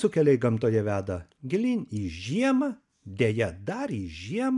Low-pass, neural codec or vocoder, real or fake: 10.8 kHz; none; real